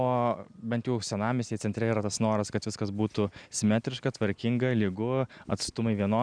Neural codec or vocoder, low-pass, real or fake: none; 9.9 kHz; real